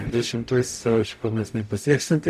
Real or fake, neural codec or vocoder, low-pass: fake; codec, 44.1 kHz, 0.9 kbps, DAC; 14.4 kHz